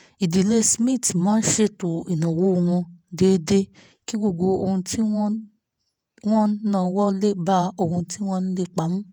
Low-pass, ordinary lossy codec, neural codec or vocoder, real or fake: none; none; vocoder, 48 kHz, 128 mel bands, Vocos; fake